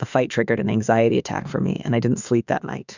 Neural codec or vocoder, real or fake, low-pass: autoencoder, 48 kHz, 32 numbers a frame, DAC-VAE, trained on Japanese speech; fake; 7.2 kHz